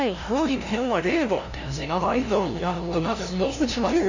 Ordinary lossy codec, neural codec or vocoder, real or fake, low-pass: none; codec, 16 kHz, 0.5 kbps, FunCodec, trained on LibriTTS, 25 frames a second; fake; 7.2 kHz